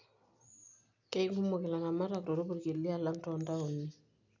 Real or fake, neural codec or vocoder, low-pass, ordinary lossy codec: real; none; 7.2 kHz; none